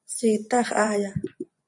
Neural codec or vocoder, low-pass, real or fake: vocoder, 24 kHz, 100 mel bands, Vocos; 10.8 kHz; fake